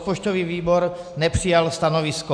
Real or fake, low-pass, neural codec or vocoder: real; 9.9 kHz; none